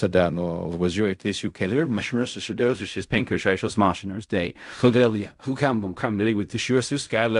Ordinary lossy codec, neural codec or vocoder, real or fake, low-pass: AAC, 96 kbps; codec, 16 kHz in and 24 kHz out, 0.4 kbps, LongCat-Audio-Codec, fine tuned four codebook decoder; fake; 10.8 kHz